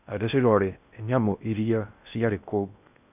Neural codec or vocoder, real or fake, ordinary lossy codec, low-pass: codec, 16 kHz in and 24 kHz out, 0.6 kbps, FocalCodec, streaming, 2048 codes; fake; none; 3.6 kHz